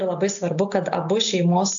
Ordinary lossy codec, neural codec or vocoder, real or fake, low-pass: AAC, 64 kbps; none; real; 7.2 kHz